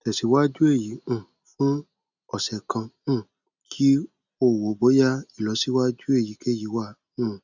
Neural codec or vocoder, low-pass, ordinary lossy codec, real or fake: none; 7.2 kHz; none; real